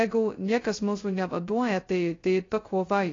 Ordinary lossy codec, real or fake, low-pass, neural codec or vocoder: AAC, 32 kbps; fake; 7.2 kHz; codec, 16 kHz, 0.2 kbps, FocalCodec